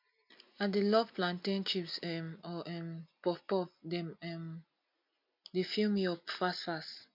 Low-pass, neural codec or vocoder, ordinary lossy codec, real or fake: 5.4 kHz; none; MP3, 48 kbps; real